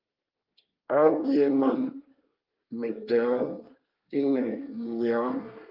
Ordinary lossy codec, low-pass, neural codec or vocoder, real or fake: Opus, 32 kbps; 5.4 kHz; codec, 24 kHz, 1 kbps, SNAC; fake